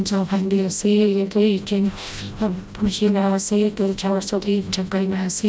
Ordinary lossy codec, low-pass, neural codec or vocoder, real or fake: none; none; codec, 16 kHz, 0.5 kbps, FreqCodec, smaller model; fake